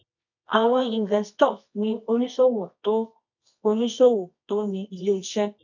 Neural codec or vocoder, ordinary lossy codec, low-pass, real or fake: codec, 24 kHz, 0.9 kbps, WavTokenizer, medium music audio release; none; 7.2 kHz; fake